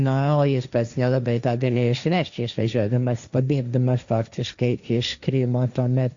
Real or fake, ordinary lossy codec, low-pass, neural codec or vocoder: fake; Opus, 64 kbps; 7.2 kHz; codec, 16 kHz, 1.1 kbps, Voila-Tokenizer